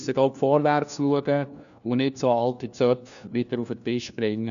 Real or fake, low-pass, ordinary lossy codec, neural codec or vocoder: fake; 7.2 kHz; none; codec, 16 kHz, 1 kbps, FunCodec, trained on LibriTTS, 50 frames a second